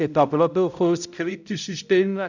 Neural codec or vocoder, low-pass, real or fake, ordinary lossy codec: codec, 16 kHz, 0.5 kbps, X-Codec, HuBERT features, trained on balanced general audio; 7.2 kHz; fake; none